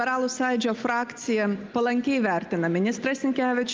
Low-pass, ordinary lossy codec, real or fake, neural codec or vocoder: 7.2 kHz; Opus, 24 kbps; real; none